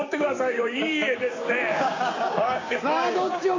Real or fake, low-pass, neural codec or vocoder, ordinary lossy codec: fake; 7.2 kHz; codec, 16 kHz, 6 kbps, DAC; AAC, 32 kbps